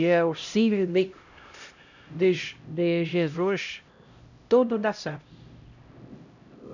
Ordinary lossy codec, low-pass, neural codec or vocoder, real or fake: none; 7.2 kHz; codec, 16 kHz, 0.5 kbps, X-Codec, HuBERT features, trained on LibriSpeech; fake